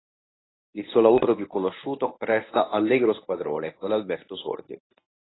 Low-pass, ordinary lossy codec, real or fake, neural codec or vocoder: 7.2 kHz; AAC, 16 kbps; fake; codec, 24 kHz, 0.9 kbps, WavTokenizer, medium speech release version 1